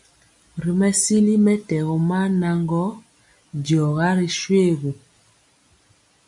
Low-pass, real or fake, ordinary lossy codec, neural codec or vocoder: 10.8 kHz; real; MP3, 64 kbps; none